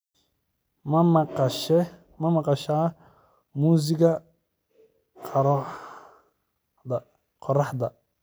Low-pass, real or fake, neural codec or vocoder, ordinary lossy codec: none; real; none; none